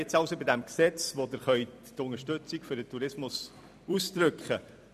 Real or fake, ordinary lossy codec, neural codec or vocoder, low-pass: fake; none; vocoder, 44.1 kHz, 128 mel bands every 256 samples, BigVGAN v2; 14.4 kHz